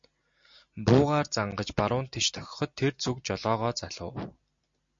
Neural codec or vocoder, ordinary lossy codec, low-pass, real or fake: none; MP3, 48 kbps; 7.2 kHz; real